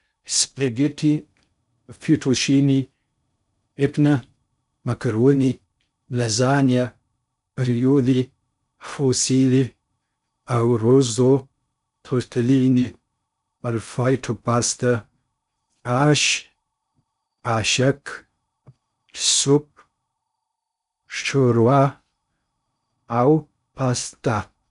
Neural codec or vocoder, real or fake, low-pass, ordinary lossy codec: codec, 16 kHz in and 24 kHz out, 0.6 kbps, FocalCodec, streaming, 2048 codes; fake; 10.8 kHz; none